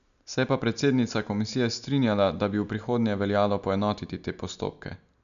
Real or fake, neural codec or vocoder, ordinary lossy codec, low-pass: real; none; none; 7.2 kHz